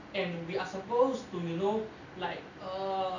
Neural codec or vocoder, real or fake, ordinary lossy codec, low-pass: none; real; none; 7.2 kHz